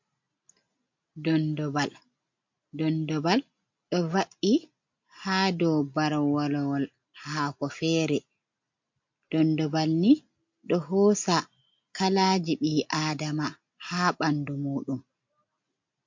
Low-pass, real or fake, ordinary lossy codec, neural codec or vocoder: 7.2 kHz; real; MP3, 48 kbps; none